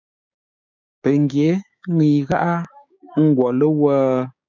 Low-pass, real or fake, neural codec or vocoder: 7.2 kHz; fake; codec, 16 kHz, 6 kbps, DAC